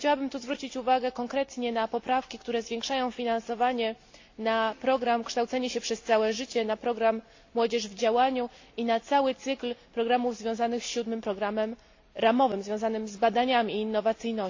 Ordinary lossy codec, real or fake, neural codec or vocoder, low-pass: AAC, 48 kbps; real; none; 7.2 kHz